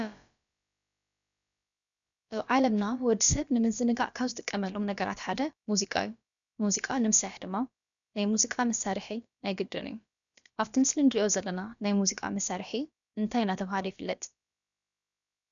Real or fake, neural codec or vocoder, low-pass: fake; codec, 16 kHz, about 1 kbps, DyCAST, with the encoder's durations; 7.2 kHz